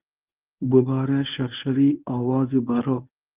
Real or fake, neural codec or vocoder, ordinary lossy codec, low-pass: fake; codec, 24 kHz, 0.9 kbps, WavTokenizer, medium speech release version 1; Opus, 24 kbps; 3.6 kHz